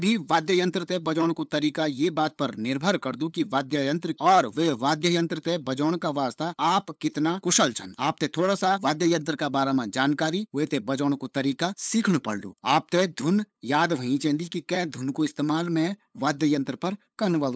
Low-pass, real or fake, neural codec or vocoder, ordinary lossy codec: none; fake; codec, 16 kHz, 8 kbps, FunCodec, trained on LibriTTS, 25 frames a second; none